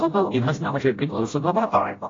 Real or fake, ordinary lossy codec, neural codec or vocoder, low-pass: fake; AAC, 32 kbps; codec, 16 kHz, 0.5 kbps, FreqCodec, smaller model; 7.2 kHz